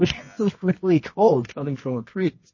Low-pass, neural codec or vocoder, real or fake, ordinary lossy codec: 7.2 kHz; codec, 24 kHz, 0.9 kbps, WavTokenizer, medium music audio release; fake; MP3, 32 kbps